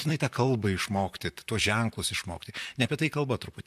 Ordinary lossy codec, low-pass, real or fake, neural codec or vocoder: MP3, 96 kbps; 14.4 kHz; fake; vocoder, 44.1 kHz, 128 mel bands, Pupu-Vocoder